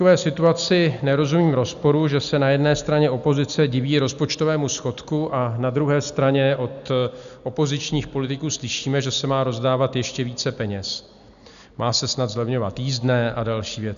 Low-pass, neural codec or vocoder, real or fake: 7.2 kHz; none; real